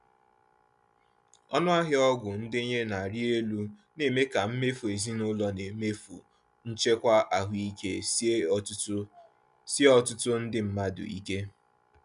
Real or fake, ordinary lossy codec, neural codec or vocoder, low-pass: real; none; none; 10.8 kHz